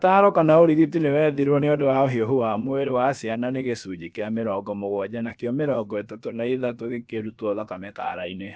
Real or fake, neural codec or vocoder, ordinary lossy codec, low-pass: fake; codec, 16 kHz, about 1 kbps, DyCAST, with the encoder's durations; none; none